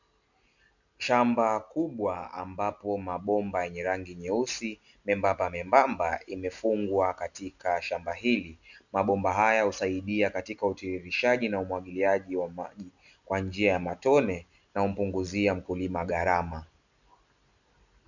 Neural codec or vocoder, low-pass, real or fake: none; 7.2 kHz; real